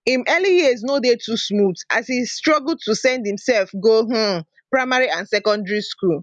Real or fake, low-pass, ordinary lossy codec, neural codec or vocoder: real; 10.8 kHz; none; none